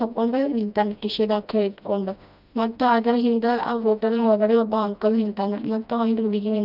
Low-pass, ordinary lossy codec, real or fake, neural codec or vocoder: 5.4 kHz; none; fake; codec, 16 kHz, 1 kbps, FreqCodec, smaller model